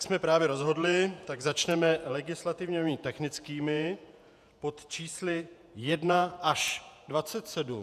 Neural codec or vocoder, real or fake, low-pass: vocoder, 48 kHz, 128 mel bands, Vocos; fake; 14.4 kHz